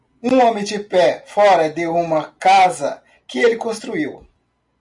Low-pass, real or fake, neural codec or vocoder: 10.8 kHz; real; none